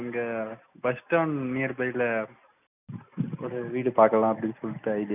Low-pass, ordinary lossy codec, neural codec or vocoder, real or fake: 3.6 kHz; none; none; real